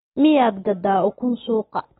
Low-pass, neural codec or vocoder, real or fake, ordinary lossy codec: 19.8 kHz; none; real; AAC, 16 kbps